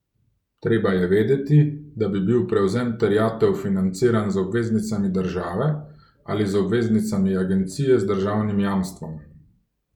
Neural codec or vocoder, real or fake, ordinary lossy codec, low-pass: none; real; none; 19.8 kHz